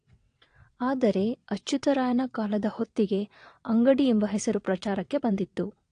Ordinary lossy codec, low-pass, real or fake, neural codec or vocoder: AAC, 48 kbps; 9.9 kHz; fake; vocoder, 22.05 kHz, 80 mel bands, WaveNeXt